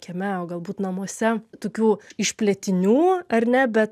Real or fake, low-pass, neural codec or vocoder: real; 14.4 kHz; none